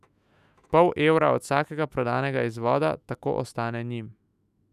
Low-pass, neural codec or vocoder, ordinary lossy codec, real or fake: 14.4 kHz; autoencoder, 48 kHz, 128 numbers a frame, DAC-VAE, trained on Japanese speech; none; fake